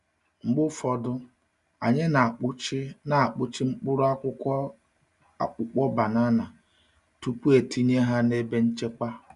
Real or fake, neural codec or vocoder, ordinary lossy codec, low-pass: real; none; none; 10.8 kHz